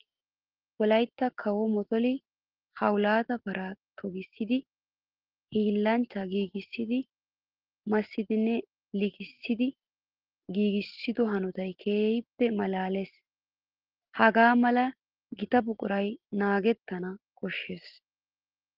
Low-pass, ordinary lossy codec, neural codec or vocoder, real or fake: 5.4 kHz; Opus, 16 kbps; none; real